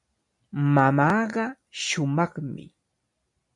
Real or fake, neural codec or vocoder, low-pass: real; none; 10.8 kHz